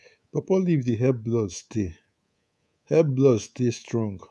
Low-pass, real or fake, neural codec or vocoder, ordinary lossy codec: none; fake; codec, 24 kHz, 3.1 kbps, DualCodec; none